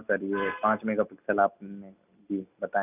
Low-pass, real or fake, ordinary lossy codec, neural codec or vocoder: 3.6 kHz; real; none; none